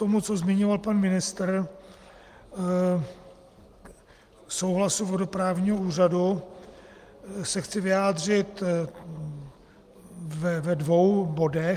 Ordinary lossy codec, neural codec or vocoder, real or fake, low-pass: Opus, 32 kbps; none; real; 14.4 kHz